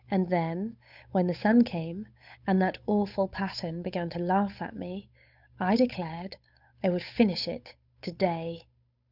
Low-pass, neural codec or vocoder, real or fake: 5.4 kHz; codec, 16 kHz, 8 kbps, FunCodec, trained on Chinese and English, 25 frames a second; fake